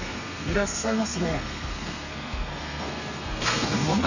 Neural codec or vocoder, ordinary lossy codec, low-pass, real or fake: codec, 32 kHz, 1.9 kbps, SNAC; none; 7.2 kHz; fake